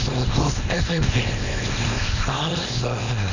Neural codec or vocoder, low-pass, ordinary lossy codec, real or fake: codec, 24 kHz, 0.9 kbps, WavTokenizer, small release; 7.2 kHz; none; fake